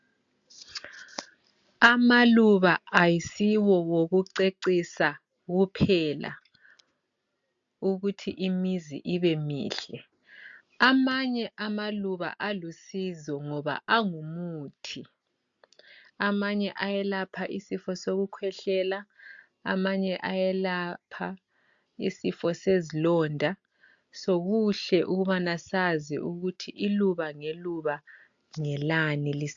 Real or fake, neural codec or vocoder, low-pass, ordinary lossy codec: real; none; 7.2 kHz; AAC, 64 kbps